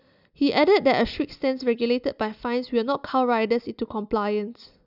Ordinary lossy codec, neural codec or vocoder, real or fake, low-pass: none; none; real; 5.4 kHz